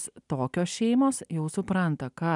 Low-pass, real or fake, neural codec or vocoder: 10.8 kHz; real; none